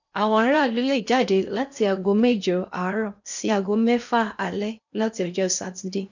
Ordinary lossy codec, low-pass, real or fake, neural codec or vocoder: none; 7.2 kHz; fake; codec, 16 kHz in and 24 kHz out, 0.8 kbps, FocalCodec, streaming, 65536 codes